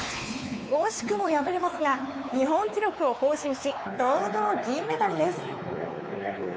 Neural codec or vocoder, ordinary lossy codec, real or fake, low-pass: codec, 16 kHz, 4 kbps, X-Codec, WavLM features, trained on Multilingual LibriSpeech; none; fake; none